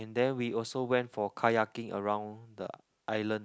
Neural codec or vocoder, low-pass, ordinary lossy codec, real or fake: none; none; none; real